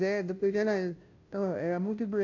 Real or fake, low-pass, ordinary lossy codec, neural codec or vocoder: fake; 7.2 kHz; AAC, 48 kbps; codec, 16 kHz, 0.5 kbps, FunCodec, trained on Chinese and English, 25 frames a second